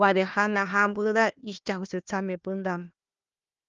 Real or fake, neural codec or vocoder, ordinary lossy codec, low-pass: fake; codec, 16 kHz, 1 kbps, FunCodec, trained on Chinese and English, 50 frames a second; Opus, 24 kbps; 7.2 kHz